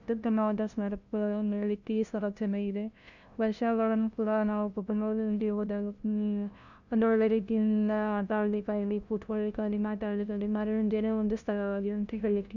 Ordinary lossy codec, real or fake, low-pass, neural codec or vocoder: none; fake; 7.2 kHz; codec, 16 kHz, 0.5 kbps, FunCodec, trained on LibriTTS, 25 frames a second